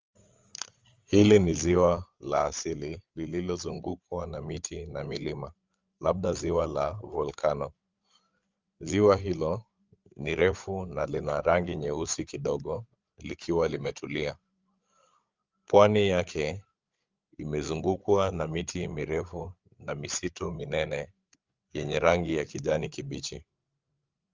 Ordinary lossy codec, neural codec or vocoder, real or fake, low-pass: Opus, 32 kbps; codec, 16 kHz, 8 kbps, FreqCodec, larger model; fake; 7.2 kHz